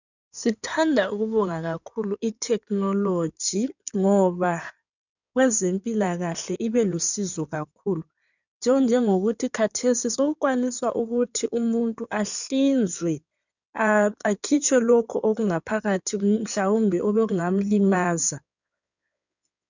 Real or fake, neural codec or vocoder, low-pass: fake; codec, 16 kHz in and 24 kHz out, 2.2 kbps, FireRedTTS-2 codec; 7.2 kHz